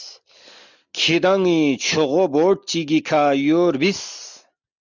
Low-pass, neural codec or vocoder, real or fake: 7.2 kHz; none; real